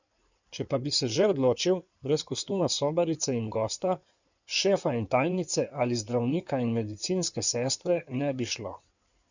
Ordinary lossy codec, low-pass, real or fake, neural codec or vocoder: none; 7.2 kHz; fake; codec, 16 kHz in and 24 kHz out, 2.2 kbps, FireRedTTS-2 codec